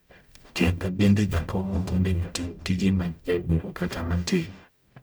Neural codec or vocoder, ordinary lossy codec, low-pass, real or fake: codec, 44.1 kHz, 0.9 kbps, DAC; none; none; fake